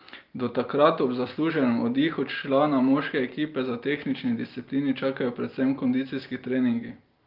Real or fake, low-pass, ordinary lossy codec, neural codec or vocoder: real; 5.4 kHz; Opus, 32 kbps; none